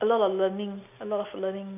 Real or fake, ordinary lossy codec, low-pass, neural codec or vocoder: real; none; 3.6 kHz; none